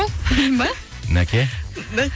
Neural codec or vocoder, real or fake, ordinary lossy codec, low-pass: none; real; none; none